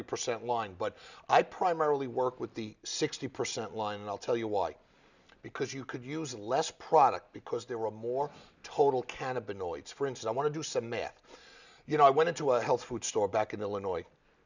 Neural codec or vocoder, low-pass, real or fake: none; 7.2 kHz; real